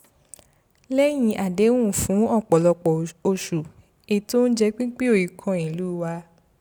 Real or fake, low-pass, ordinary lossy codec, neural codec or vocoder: real; none; none; none